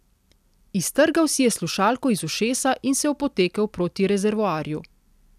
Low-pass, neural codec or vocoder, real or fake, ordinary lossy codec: 14.4 kHz; none; real; none